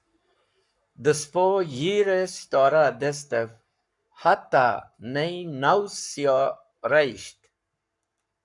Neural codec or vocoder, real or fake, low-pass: codec, 44.1 kHz, 7.8 kbps, Pupu-Codec; fake; 10.8 kHz